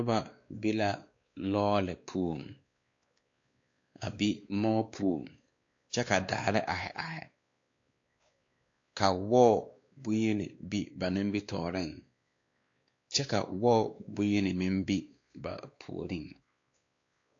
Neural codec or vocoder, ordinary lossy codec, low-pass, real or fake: codec, 16 kHz, 2 kbps, X-Codec, WavLM features, trained on Multilingual LibriSpeech; AAC, 48 kbps; 7.2 kHz; fake